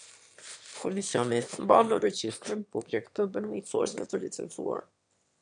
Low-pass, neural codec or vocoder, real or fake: 9.9 kHz; autoencoder, 22.05 kHz, a latent of 192 numbers a frame, VITS, trained on one speaker; fake